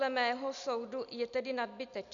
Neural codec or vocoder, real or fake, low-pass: none; real; 7.2 kHz